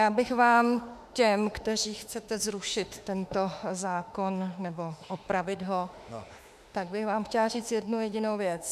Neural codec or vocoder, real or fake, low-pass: autoencoder, 48 kHz, 32 numbers a frame, DAC-VAE, trained on Japanese speech; fake; 14.4 kHz